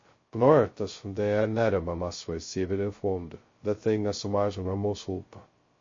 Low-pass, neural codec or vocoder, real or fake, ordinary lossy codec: 7.2 kHz; codec, 16 kHz, 0.2 kbps, FocalCodec; fake; MP3, 32 kbps